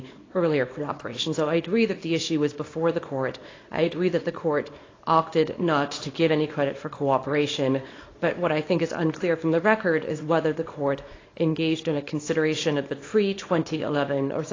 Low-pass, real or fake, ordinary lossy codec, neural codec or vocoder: 7.2 kHz; fake; AAC, 32 kbps; codec, 24 kHz, 0.9 kbps, WavTokenizer, small release